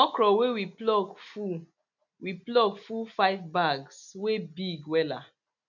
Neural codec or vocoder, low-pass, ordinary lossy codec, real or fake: none; 7.2 kHz; none; real